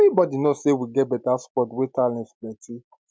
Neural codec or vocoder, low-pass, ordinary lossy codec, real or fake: none; none; none; real